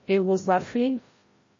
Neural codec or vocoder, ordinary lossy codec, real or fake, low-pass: codec, 16 kHz, 0.5 kbps, FreqCodec, larger model; MP3, 32 kbps; fake; 7.2 kHz